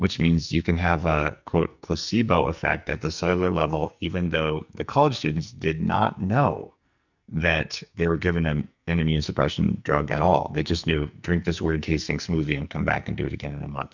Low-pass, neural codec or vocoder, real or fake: 7.2 kHz; codec, 44.1 kHz, 2.6 kbps, SNAC; fake